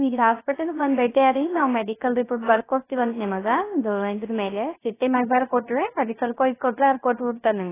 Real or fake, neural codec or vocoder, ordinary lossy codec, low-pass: fake; codec, 16 kHz, 0.3 kbps, FocalCodec; AAC, 16 kbps; 3.6 kHz